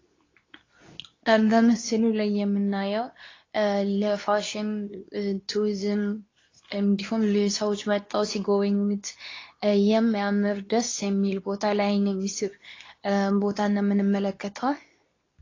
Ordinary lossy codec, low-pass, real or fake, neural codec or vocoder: AAC, 32 kbps; 7.2 kHz; fake; codec, 24 kHz, 0.9 kbps, WavTokenizer, medium speech release version 2